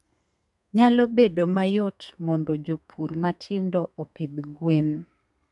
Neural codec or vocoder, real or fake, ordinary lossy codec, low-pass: codec, 32 kHz, 1.9 kbps, SNAC; fake; none; 10.8 kHz